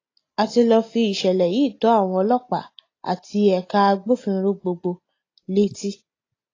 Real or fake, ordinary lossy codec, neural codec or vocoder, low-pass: real; AAC, 32 kbps; none; 7.2 kHz